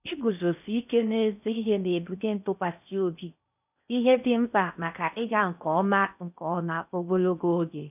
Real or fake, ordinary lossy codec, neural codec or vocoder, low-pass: fake; none; codec, 16 kHz in and 24 kHz out, 0.6 kbps, FocalCodec, streaming, 4096 codes; 3.6 kHz